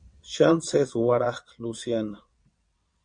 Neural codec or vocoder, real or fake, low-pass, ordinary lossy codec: vocoder, 22.05 kHz, 80 mel bands, WaveNeXt; fake; 9.9 kHz; MP3, 48 kbps